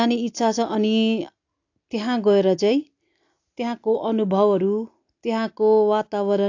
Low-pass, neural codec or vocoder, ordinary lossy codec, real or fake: 7.2 kHz; none; AAC, 48 kbps; real